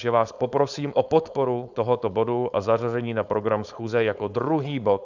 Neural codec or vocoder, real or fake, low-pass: codec, 16 kHz, 4.8 kbps, FACodec; fake; 7.2 kHz